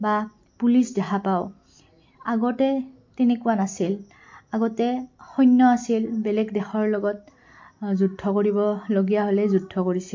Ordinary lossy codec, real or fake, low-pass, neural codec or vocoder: MP3, 48 kbps; real; 7.2 kHz; none